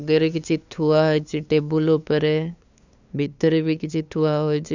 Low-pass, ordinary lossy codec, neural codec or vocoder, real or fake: 7.2 kHz; none; codec, 16 kHz, 2 kbps, FunCodec, trained on LibriTTS, 25 frames a second; fake